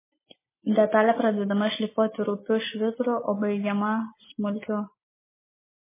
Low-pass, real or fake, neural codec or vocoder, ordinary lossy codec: 3.6 kHz; real; none; MP3, 16 kbps